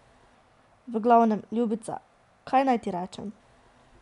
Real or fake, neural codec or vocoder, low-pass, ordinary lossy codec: real; none; 10.8 kHz; none